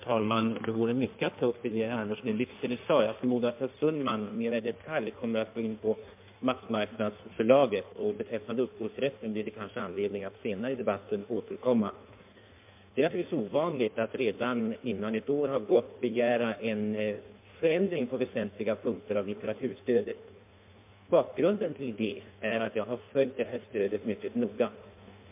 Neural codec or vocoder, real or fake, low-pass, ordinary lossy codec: codec, 16 kHz in and 24 kHz out, 1.1 kbps, FireRedTTS-2 codec; fake; 3.6 kHz; MP3, 32 kbps